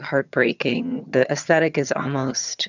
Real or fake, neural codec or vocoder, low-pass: fake; vocoder, 22.05 kHz, 80 mel bands, HiFi-GAN; 7.2 kHz